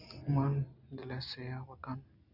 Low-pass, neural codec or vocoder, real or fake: 5.4 kHz; none; real